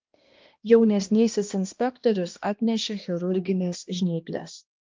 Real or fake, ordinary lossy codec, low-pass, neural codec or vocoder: fake; Opus, 32 kbps; 7.2 kHz; codec, 16 kHz, 1 kbps, X-Codec, HuBERT features, trained on balanced general audio